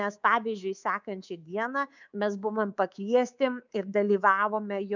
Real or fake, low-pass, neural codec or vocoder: fake; 7.2 kHz; codec, 24 kHz, 3.1 kbps, DualCodec